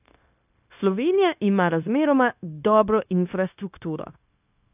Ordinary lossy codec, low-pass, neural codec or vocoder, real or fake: AAC, 32 kbps; 3.6 kHz; codec, 16 kHz, 0.9 kbps, LongCat-Audio-Codec; fake